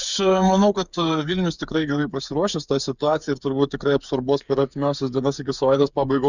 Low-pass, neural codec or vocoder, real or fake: 7.2 kHz; codec, 16 kHz, 8 kbps, FreqCodec, smaller model; fake